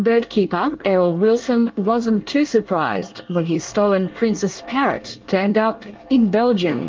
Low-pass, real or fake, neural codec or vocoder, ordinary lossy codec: 7.2 kHz; fake; codec, 24 kHz, 1 kbps, SNAC; Opus, 32 kbps